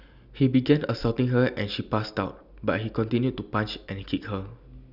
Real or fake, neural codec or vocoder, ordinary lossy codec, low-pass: real; none; none; 5.4 kHz